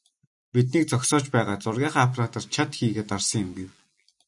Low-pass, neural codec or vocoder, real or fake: 10.8 kHz; none; real